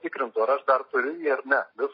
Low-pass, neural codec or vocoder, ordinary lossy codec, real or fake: 5.4 kHz; none; MP3, 24 kbps; real